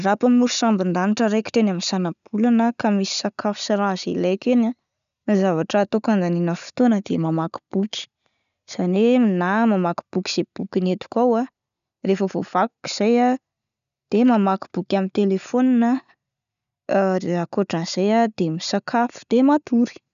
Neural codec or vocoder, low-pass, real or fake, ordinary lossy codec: none; 7.2 kHz; real; none